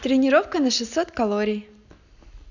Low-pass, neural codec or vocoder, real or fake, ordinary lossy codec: 7.2 kHz; none; real; none